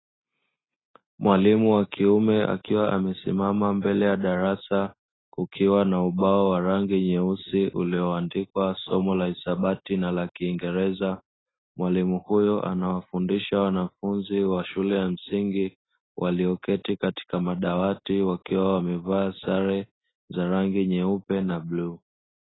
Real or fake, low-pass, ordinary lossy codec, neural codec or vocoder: real; 7.2 kHz; AAC, 16 kbps; none